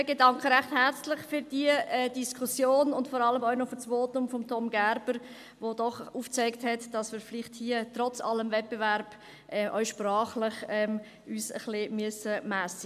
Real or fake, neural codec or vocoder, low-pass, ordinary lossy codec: real; none; 14.4 kHz; MP3, 96 kbps